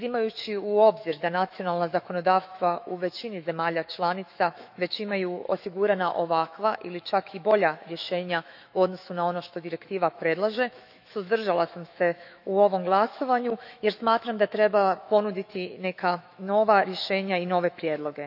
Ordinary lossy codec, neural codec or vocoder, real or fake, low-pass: none; autoencoder, 48 kHz, 128 numbers a frame, DAC-VAE, trained on Japanese speech; fake; 5.4 kHz